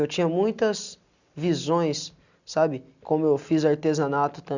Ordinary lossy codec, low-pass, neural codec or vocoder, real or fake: none; 7.2 kHz; none; real